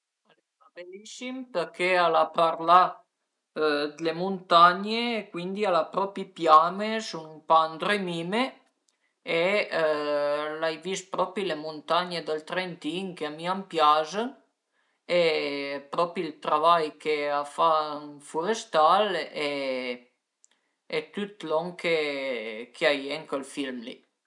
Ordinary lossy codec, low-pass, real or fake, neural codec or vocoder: none; 10.8 kHz; real; none